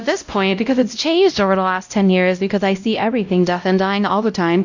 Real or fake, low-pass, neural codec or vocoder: fake; 7.2 kHz; codec, 16 kHz, 0.5 kbps, X-Codec, WavLM features, trained on Multilingual LibriSpeech